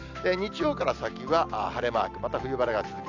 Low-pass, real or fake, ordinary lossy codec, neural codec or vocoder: 7.2 kHz; real; none; none